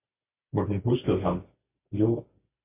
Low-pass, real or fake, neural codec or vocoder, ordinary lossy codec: 3.6 kHz; real; none; MP3, 32 kbps